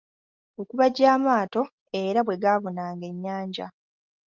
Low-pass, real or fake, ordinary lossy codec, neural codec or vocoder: 7.2 kHz; real; Opus, 24 kbps; none